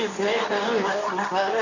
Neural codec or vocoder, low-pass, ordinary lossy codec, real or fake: codec, 24 kHz, 0.9 kbps, WavTokenizer, medium speech release version 2; 7.2 kHz; none; fake